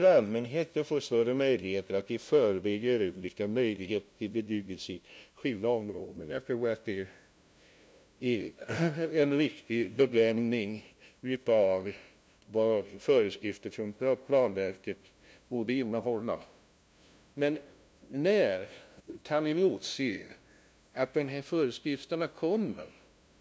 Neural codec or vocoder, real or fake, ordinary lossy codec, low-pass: codec, 16 kHz, 0.5 kbps, FunCodec, trained on LibriTTS, 25 frames a second; fake; none; none